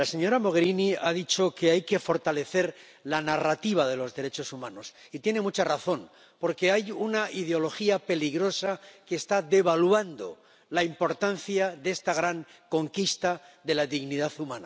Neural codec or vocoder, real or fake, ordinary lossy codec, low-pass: none; real; none; none